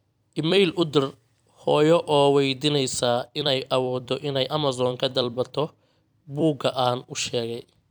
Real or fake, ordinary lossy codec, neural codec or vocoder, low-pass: fake; none; vocoder, 44.1 kHz, 128 mel bands every 256 samples, BigVGAN v2; none